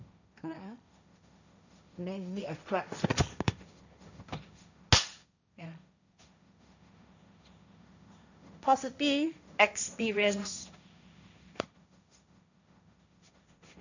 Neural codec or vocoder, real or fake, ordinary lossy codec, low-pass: codec, 16 kHz, 1.1 kbps, Voila-Tokenizer; fake; none; 7.2 kHz